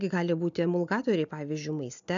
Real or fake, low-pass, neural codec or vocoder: real; 7.2 kHz; none